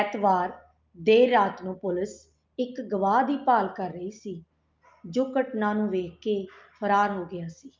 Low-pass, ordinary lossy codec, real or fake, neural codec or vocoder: 7.2 kHz; Opus, 32 kbps; real; none